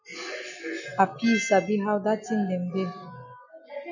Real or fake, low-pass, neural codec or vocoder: real; 7.2 kHz; none